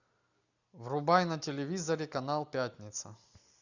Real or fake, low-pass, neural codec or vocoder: real; 7.2 kHz; none